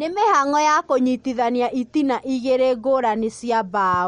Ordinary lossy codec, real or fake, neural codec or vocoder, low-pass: MP3, 48 kbps; real; none; 9.9 kHz